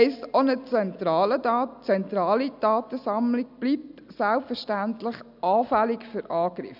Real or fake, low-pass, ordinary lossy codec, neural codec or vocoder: real; 5.4 kHz; none; none